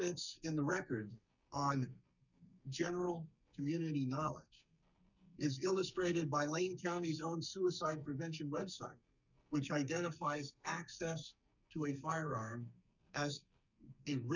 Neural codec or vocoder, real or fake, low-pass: codec, 44.1 kHz, 2.6 kbps, SNAC; fake; 7.2 kHz